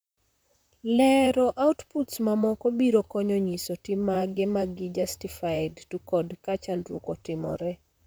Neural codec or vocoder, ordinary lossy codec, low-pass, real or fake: vocoder, 44.1 kHz, 128 mel bands, Pupu-Vocoder; none; none; fake